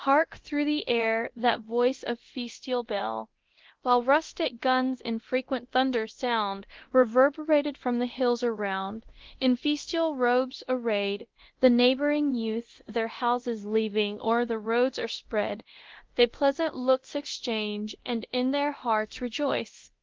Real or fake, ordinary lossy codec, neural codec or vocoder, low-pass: fake; Opus, 16 kbps; codec, 24 kHz, 0.9 kbps, DualCodec; 7.2 kHz